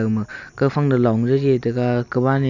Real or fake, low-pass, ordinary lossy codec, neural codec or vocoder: real; 7.2 kHz; none; none